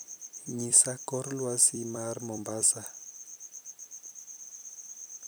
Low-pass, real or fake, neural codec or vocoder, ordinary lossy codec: none; fake; vocoder, 44.1 kHz, 128 mel bands every 256 samples, BigVGAN v2; none